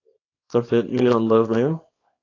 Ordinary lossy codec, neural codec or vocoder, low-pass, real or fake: AAC, 32 kbps; codec, 24 kHz, 0.9 kbps, WavTokenizer, small release; 7.2 kHz; fake